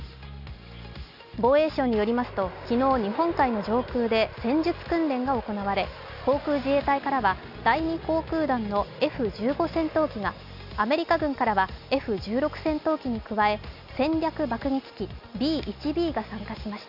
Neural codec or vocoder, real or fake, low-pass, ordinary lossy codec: none; real; 5.4 kHz; none